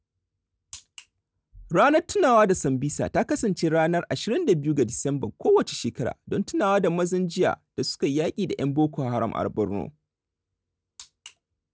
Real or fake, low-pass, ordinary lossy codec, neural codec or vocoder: real; none; none; none